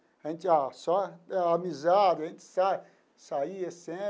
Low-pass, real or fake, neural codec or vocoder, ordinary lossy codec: none; real; none; none